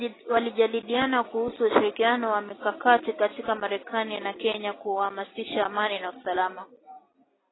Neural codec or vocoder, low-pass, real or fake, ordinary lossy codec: none; 7.2 kHz; real; AAC, 16 kbps